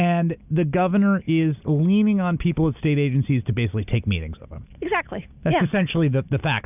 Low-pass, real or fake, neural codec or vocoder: 3.6 kHz; real; none